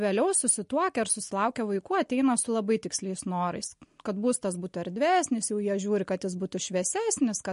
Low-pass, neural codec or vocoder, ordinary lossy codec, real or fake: 10.8 kHz; none; MP3, 48 kbps; real